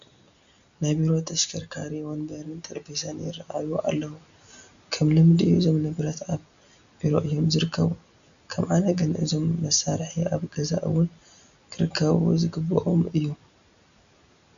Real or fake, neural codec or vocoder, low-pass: real; none; 7.2 kHz